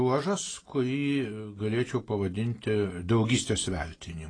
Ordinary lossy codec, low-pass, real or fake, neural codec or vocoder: AAC, 32 kbps; 9.9 kHz; real; none